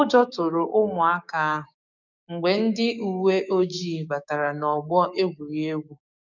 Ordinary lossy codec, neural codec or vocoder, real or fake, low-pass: none; codec, 16 kHz, 6 kbps, DAC; fake; 7.2 kHz